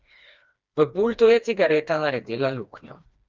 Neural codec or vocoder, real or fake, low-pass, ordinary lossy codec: codec, 16 kHz, 2 kbps, FreqCodec, smaller model; fake; 7.2 kHz; Opus, 24 kbps